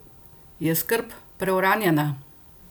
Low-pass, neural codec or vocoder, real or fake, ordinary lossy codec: none; none; real; none